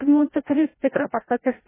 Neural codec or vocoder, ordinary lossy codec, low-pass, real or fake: codec, 16 kHz, 0.5 kbps, FreqCodec, larger model; MP3, 16 kbps; 3.6 kHz; fake